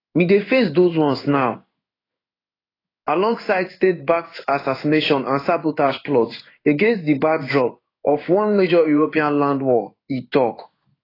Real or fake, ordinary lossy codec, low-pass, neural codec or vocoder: fake; AAC, 24 kbps; 5.4 kHz; codec, 16 kHz in and 24 kHz out, 1 kbps, XY-Tokenizer